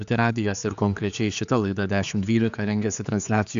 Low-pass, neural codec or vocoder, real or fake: 7.2 kHz; codec, 16 kHz, 4 kbps, X-Codec, HuBERT features, trained on general audio; fake